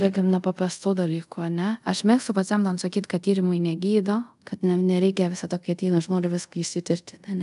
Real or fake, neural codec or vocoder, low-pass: fake; codec, 24 kHz, 0.5 kbps, DualCodec; 10.8 kHz